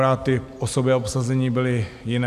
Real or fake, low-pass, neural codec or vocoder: real; 14.4 kHz; none